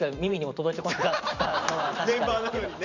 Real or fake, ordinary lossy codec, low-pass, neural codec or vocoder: fake; none; 7.2 kHz; vocoder, 44.1 kHz, 128 mel bands every 512 samples, BigVGAN v2